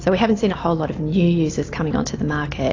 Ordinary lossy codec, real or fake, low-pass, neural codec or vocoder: AAC, 32 kbps; real; 7.2 kHz; none